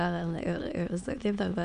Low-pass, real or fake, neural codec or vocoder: 9.9 kHz; fake; autoencoder, 22.05 kHz, a latent of 192 numbers a frame, VITS, trained on many speakers